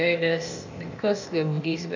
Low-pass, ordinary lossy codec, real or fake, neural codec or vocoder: 7.2 kHz; AAC, 48 kbps; fake; codec, 16 kHz, 0.8 kbps, ZipCodec